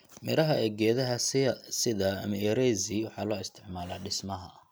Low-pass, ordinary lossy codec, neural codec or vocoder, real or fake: none; none; none; real